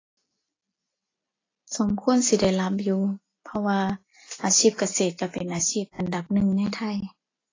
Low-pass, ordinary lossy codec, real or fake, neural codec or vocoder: 7.2 kHz; AAC, 32 kbps; real; none